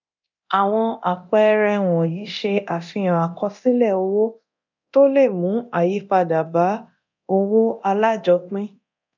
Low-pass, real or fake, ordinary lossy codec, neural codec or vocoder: 7.2 kHz; fake; none; codec, 24 kHz, 0.9 kbps, DualCodec